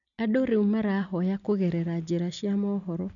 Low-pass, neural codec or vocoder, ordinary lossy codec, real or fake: 7.2 kHz; none; none; real